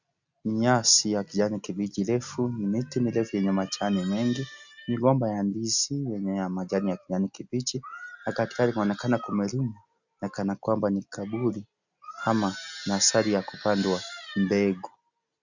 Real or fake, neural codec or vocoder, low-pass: real; none; 7.2 kHz